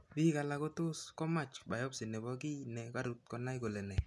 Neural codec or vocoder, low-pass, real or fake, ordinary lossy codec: none; none; real; none